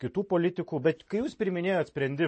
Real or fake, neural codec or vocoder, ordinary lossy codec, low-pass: real; none; MP3, 32 kbps; 10.8 kHz